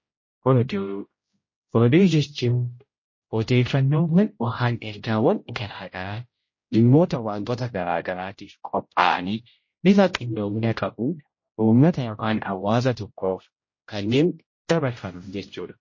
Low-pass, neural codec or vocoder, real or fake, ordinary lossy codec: 7.2 kHz; codec, 16 kHz, 0.5 kbps, X-Codec, HuBERT features, trained on general audio; fake; MP3, 32 kbps